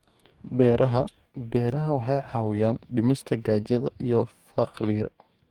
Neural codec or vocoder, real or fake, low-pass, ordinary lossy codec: codec, 44.1 kHz, 2.6 kbps, DAC; fake; 19.8 kHz; Opus, 32 kbps